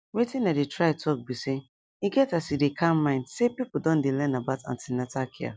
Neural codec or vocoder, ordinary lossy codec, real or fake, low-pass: none; none; real; none